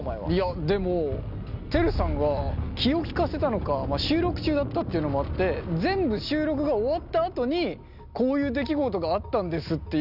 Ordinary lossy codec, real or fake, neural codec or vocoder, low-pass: none; real; none; 5.4 kHz